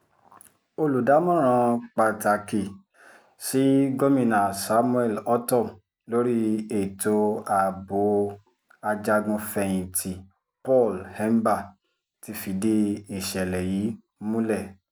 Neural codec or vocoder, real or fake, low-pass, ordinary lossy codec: none; real; none; none